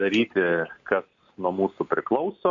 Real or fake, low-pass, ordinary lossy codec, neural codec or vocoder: real; 7.2 kHz; MP3, 64 kbps; none